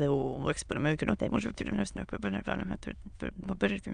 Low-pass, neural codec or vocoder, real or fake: 9.9 kHz; autoencoder, 22.05 kHz, a latent of 192 numbers a frame, VITS, trained on many speakers; fake